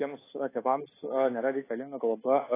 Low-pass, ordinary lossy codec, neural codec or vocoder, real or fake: 3.6 kHz; AAC, 16 kbps; codec, 24 kHz, 1.2 kbps, DualCodec; fake